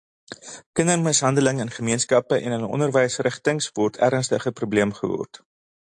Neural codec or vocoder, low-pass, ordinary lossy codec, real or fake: none; 10.8 kHz; AAC, 64 kbps; real